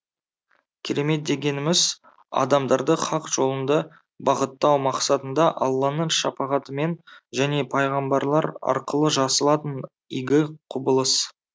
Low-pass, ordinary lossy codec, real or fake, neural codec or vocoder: none; none; real; none